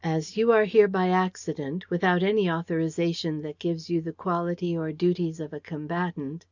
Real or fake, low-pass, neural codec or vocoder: real; 7.2 kHz; none